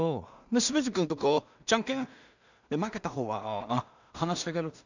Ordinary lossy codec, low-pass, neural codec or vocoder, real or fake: none; 7.2 kHz; codec, 16 kHz in and 24 kHz out, 0.4 kbps, LongCat-Audio-Codec, two codebook decoder; fake